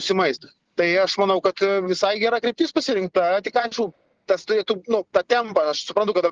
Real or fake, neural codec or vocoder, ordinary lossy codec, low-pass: real; none; Opus, 16 kbps; 7.2 kHz